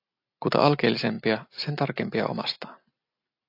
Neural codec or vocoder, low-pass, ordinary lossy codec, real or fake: none; 5.4 kHz; AAC, 32 kbps; real